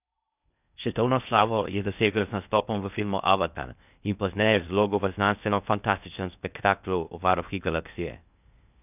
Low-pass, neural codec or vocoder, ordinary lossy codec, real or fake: 3.6 kHz; codec, 16 kHz in and 24 kHz out, 0.6 kbps, FocalCodec, streaming, 4096 codes; none; fake